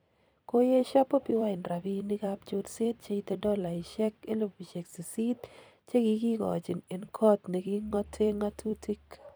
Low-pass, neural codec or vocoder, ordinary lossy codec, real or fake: none; vocoder, 44.1 kHz, 128 mel bands every 256 samples, BigVGAN v2; none; fake